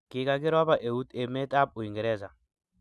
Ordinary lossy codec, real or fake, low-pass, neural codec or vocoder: none; real; none; none